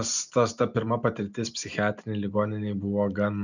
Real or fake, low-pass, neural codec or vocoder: real; 7.2 kHz; none